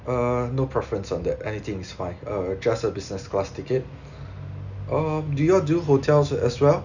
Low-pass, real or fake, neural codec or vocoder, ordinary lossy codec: 7.2 kHz; real; none; none